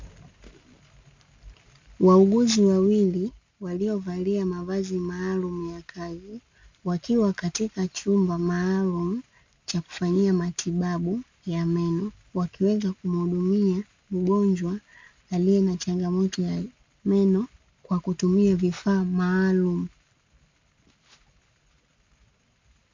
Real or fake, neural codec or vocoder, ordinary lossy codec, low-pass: real; none; MP3, 64 kbps; 7.2 kHz